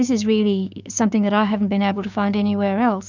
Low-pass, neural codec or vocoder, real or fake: 7.2 kHz; codec, 16 kHz, 6 kbps, DAC; fake